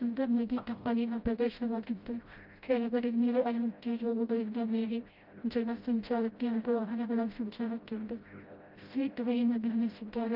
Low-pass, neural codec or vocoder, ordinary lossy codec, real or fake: 5.4 kHz; codec, 16 kHz, 0.5 kbps, FreqCodec, smaller model; Opus, 24 kbps; fake